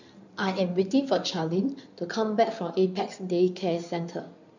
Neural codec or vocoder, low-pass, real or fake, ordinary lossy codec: codec, 16 kHz in and 24 kHz out, 2.2 kbps, FireRedTTS-2 codec; 7.2 kHz; fake; none